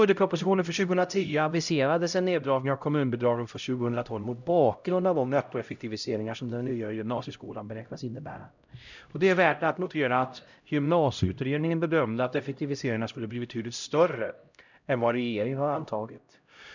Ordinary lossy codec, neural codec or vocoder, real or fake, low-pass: none; codec, 16 kHz, 0.5 kbps, X-Codec, HuBERT features, trained on LibriSpeech; fake; 7.2 kHz